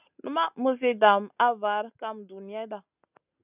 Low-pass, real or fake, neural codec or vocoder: 3.6 kHz; real; none